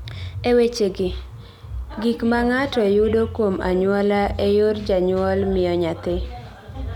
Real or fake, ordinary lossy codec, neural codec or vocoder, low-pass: real; none; none; 19.8 kHz